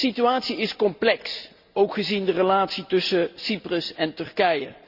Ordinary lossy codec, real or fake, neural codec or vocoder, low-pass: Opus, 64 kbps; real; none; 5.4 kHz